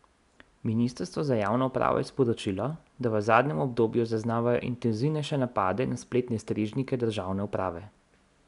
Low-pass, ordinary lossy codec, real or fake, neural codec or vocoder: 10.8 kHz; none; real; none